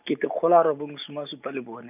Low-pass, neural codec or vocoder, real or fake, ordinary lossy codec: 3.6 kHz; none; real; none